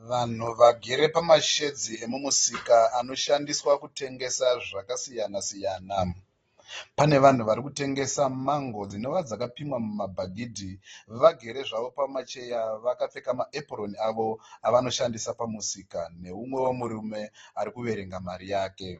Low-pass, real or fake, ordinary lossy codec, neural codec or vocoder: 19.8 kHz; real; AAC, 24 kbps; none